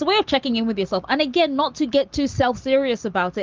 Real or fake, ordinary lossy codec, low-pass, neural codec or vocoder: real; Opus, 32 kbps; 7.2 kHz; none